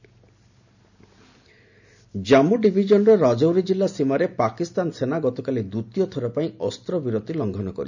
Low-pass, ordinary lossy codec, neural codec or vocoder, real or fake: 7.2 kHz; none; none; real